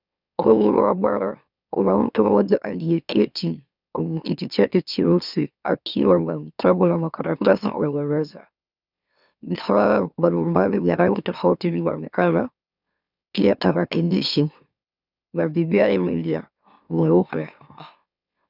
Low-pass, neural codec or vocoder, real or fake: 5.4 kHz; autoencoder, 44.1 kHz, a latent of 192 numbers a frame, MeloTTS; fake